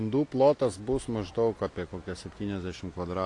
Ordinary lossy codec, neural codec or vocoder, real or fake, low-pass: AAC, 48 kbps; none; real; 10.8 kHz